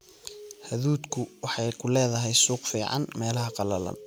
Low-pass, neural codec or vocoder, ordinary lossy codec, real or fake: none; none; none; real